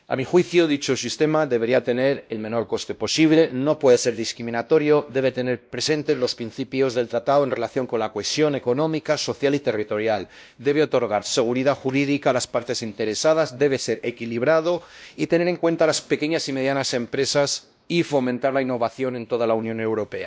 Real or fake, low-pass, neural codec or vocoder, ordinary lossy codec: fake; none; codec, 16 kHz, 1 kbps, X-Codec, WavLM features, trained on Multilingual LibriSpeech; none